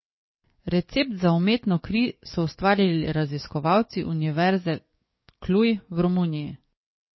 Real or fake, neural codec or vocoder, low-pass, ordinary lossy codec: fake; codec, 44.1 kHz, 7.8 kbps, DAC; 7.2 kHz; MP3, 24 kbps